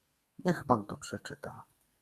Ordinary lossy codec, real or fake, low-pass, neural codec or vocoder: Opus, 64 kbps; fake; 14.4 kHz; codec, 32 kHz, 1.9 kbps, SNAC